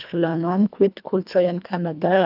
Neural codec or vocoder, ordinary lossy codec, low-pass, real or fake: codec, 24 kHz, 1.5 kbps, HILCodec; none; 5.4 kHz; fake